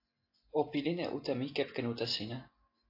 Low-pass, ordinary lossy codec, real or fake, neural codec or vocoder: 5.4 kHz; AAC, 32 kbps; real; none